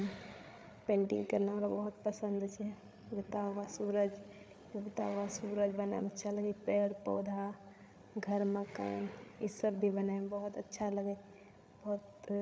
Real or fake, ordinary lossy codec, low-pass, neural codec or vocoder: fake; none; none; codec, 16 kHz, 8 kbps, FreqCodec, larger model